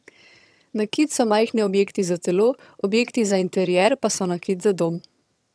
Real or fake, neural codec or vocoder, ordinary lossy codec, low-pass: fake; vocoder, 22.05 kHz, 80 mel bands, HiFi-GAN; none; none